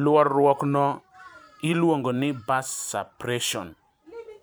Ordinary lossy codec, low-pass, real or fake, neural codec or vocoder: none; none; fake; vocoder, 44.1 kHz, 128 mel bands every 512 samples, BigVGAN v2